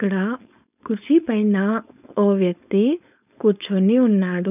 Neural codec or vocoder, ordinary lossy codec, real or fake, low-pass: codec, 16 kHz, 4.8 kbps, FACodec; none; fake; 3.6 kHz